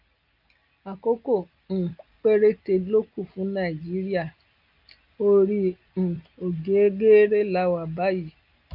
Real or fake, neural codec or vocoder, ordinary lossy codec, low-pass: real; none; Opus, 24 kbps; 5.4 kHz